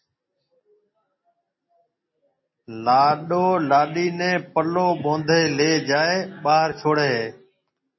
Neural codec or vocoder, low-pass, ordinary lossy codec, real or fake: none; 7.2 kHz; MP3, 24 kbps; real